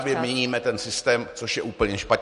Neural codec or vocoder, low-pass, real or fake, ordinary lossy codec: none; 14.4 kHz; real; MP3, 48 kbps